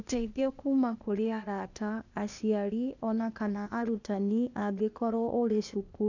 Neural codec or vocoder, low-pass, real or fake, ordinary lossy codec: codec, 16 kHz, 0.8 kbps, ZipCodec; 7.2 kHz; fake; none